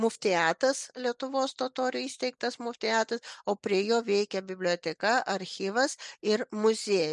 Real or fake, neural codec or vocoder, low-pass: real; none; 10.8 kHz